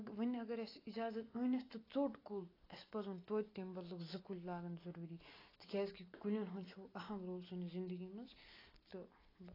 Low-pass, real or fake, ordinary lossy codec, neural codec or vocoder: 5.4 kHz; real; AAC, 24 kbps; none